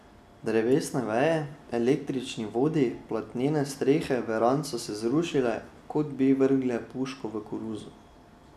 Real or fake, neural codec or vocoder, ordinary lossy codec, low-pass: real; none; none; 14.4 kHz